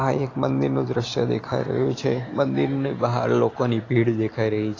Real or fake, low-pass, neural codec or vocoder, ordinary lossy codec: real; 7.2 kHz; none; AAC, 32 kbps